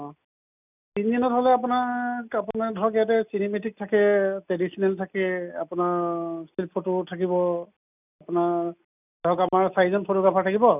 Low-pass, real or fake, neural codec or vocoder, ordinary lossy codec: 3.6 kHz; real; none; none